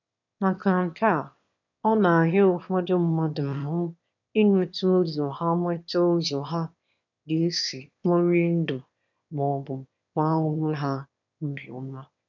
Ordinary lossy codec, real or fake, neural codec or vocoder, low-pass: none; fake; autoencoder, 22.05 kHz, a latent of 192 numbers a frame, VITS, trained on one speaker; 7.2 kHz